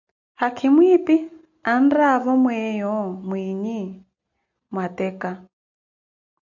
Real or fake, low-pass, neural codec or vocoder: real; 7.2 kHz; none